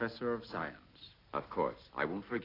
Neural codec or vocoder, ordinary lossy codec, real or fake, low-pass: none; AAC, 24 kbps; real; 5.4 kHz